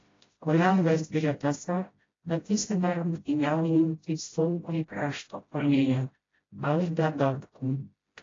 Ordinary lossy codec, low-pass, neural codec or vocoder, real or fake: AAC, 32 kbps; 7.2 kHz; codec, 16 kHz, 0.5 kbps, FreqCodec, smaller model; fake